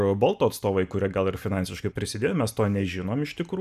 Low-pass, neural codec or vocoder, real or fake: 14.4 kHz; none; real